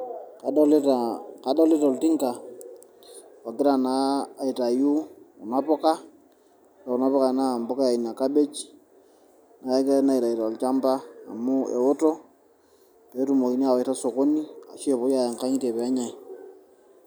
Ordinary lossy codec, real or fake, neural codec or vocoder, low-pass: none; real; none; none